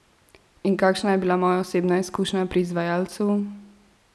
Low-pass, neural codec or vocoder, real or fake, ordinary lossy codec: none; none; real; none